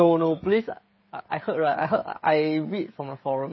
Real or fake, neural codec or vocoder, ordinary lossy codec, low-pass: fake; codec, 16 kHz, 16 kbps, FreqCodec, smaller model; MP3, 24 kbps; 7.2 kHz